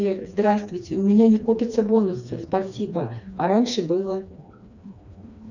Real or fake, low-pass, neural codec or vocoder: fake; 7.2 kHz; codec, 16 kHz, 2 kbps, FreqCodec, smaller model